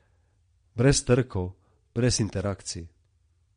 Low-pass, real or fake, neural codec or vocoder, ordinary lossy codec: 9.9 kHz; fake; vocoder, 22.05 kHz, 80 mel bands, Vocos; MP3, 48 kbps